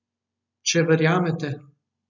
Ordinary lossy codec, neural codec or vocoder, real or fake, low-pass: none; none; real; 7.2 kHz